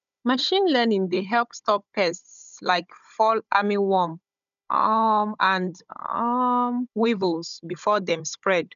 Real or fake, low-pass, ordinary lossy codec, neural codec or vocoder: fake; 7.2 kHz; none; codec, 16 kHz, 16 kbps, FunCodec, trained on Chinese and English, 50 frames a second